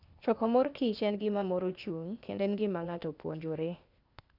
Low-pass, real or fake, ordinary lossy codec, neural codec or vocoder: 5.4 kHz; fake; none; codec, 16 kHz, 0.8 kbps, ZipCodec